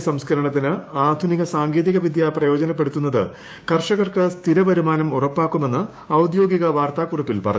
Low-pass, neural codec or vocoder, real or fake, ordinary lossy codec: none; codec, 16 kHz, 6 kbps, DAC; fake; none